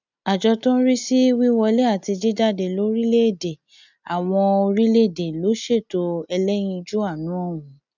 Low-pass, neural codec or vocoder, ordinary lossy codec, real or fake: 7.2 kHz; none; none; real